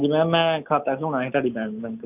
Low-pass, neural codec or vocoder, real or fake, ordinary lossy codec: 3.6 kHz; none; real; none